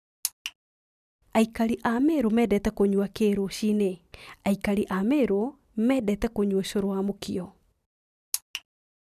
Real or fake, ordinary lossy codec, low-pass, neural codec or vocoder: real; none; 14.4 kHz; none